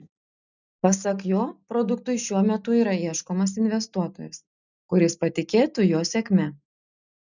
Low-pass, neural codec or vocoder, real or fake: 7.2 kHz; none; real